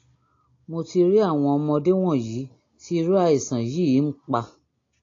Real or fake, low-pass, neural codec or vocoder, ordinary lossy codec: real; 7.2 kHz; none; AAC, 32 kbps